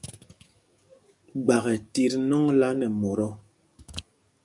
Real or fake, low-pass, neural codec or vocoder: fake; 10.8 kHz; codec, 44.1 kHz, 7.8 kbps, DAC